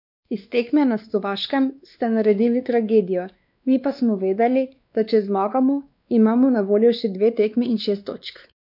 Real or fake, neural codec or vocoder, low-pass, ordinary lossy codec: fake; codec, 16 kHz, 2 kbps, X-Codec, WavLM features, trained on Multilingual LibriSpeech; 5.4 kHz; none